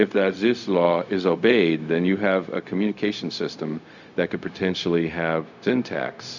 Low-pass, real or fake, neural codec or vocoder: 7.2 kHz; fake; codec, 16 kHz, 0.4 kbps, LongCat-Audio-Codec